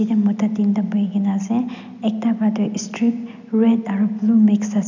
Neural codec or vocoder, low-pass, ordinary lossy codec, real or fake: none; 7.2 kHz; none; real